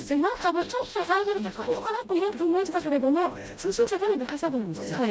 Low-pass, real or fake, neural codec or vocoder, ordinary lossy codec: none; fake; codec, 16 kHz, 0.5 kbps, FreqCodec, smaller model; none